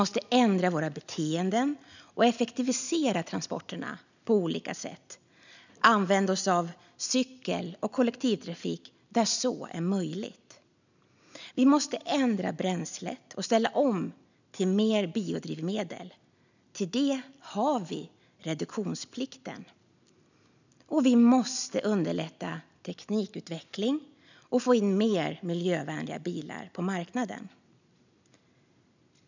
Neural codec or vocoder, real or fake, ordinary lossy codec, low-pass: none; real; none; 7.2 kHz